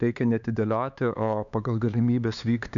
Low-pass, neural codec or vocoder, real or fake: 7.2 kHz; codec, 16 kHz, 4 kbps, X-Codec, HuBERT features, trained on LibriSpeech; fake